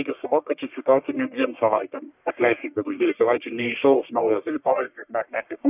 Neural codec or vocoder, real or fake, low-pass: codec, 44.1 kHz, 1.7 kbps, Pupu-Codec; fake; 3.6 kHz